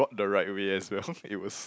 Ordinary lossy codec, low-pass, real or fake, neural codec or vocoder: none; none; real; none